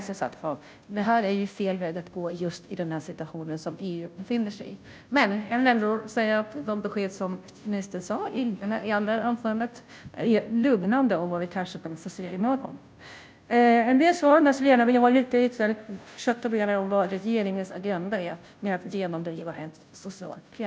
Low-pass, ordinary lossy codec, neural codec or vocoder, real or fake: none; none; codec, 16 kHz, 0.5 kbps, FunCodec, trained on Chinese and English, 25 frames a second; fake